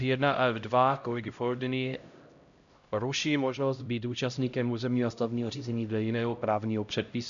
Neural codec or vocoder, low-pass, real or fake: codec, 16 kHz, 0.5 kbps, X-Codec, HuBERT features, trained on LibriSpeech; 7.2 kHz; fake